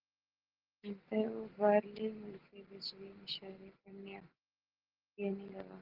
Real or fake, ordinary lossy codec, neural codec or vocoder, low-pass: real; Opus, 16 kbps; none; 5.4 kHz